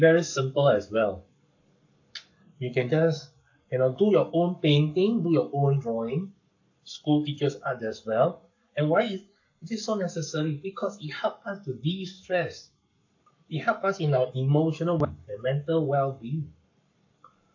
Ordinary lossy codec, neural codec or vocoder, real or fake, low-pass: AAC, 48 kbps; codec, 44.1 kHz, 7.8 kbps, Pupu-Codec; fake; 7.2 kHz